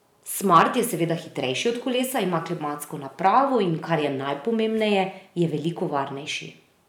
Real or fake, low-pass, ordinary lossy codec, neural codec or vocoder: fake; 19.8 kHz; none; vocoder, 44.1 kHz, 128 mel bands every 512 samples, BigVGAN v2